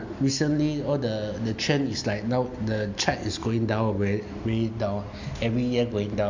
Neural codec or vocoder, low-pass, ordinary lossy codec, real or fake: none; 7.2 kHz; MP3, 48 kbps; real